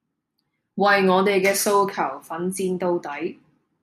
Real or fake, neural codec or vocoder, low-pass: real; none; 14.4 kHz